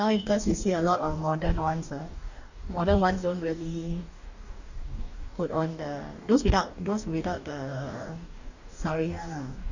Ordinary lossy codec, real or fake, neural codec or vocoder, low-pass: none; fake; codec, 44.1 kHz, 2.6 kbps, DAC; 7.2 kHz